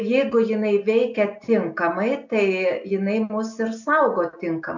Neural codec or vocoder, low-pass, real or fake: none; 7.2 kHz; real